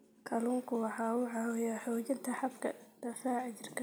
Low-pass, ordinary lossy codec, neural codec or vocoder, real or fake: none; none; none; real